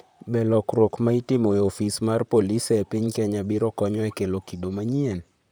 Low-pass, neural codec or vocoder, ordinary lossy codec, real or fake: none; vocoder, 44.1 kHz, 128 mel bands, Pupu-Vocoder; none; fake